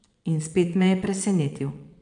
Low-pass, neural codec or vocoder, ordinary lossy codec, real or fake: 9.9 kHz; vocoder, 22.05 kHz, 80 mel bands, Vocos; MP3, 96 kbps; fake